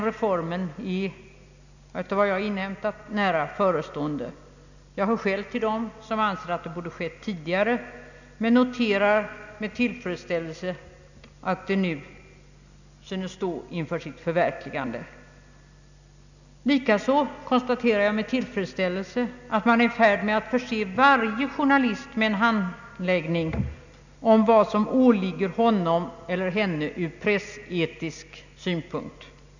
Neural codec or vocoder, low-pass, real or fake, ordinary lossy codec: none; 7.2 kHz; real; none